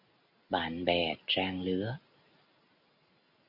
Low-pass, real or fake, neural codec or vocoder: 5.4 kHz; real; none